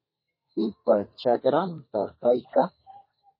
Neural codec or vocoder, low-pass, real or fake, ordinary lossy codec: codec, 32 kHz, 1.9 kbps, SNAC; 5.4 kHz; fake; MP3, 24 kbps